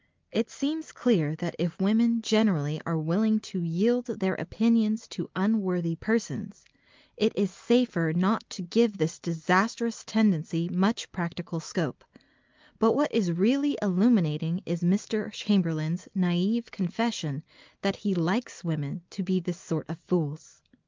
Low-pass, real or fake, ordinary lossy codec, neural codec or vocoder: 7.2 kHz; real; Opus, 32 kbps; none